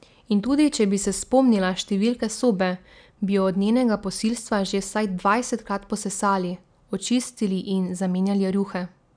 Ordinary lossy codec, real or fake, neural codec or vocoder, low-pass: none; real; none; 9.9 kHz